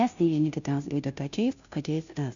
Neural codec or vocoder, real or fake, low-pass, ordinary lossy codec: codec, 16 kHz, 0.5 kbps, FunCodec, trained on Chinese and English, 25 frames a second; fake; 7.2 kHz; MP3, 96 kbps